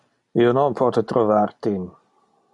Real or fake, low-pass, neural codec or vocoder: real; 10.8 kHz; none